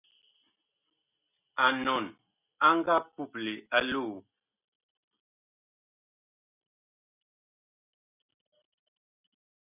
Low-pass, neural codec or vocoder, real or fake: 3.6 kHz; none; real